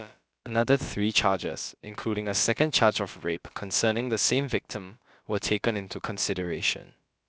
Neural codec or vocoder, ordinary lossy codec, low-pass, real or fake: codec, 16 kHz, about 1 kbps, DyCAST, with the encoder's durations; none; none; fake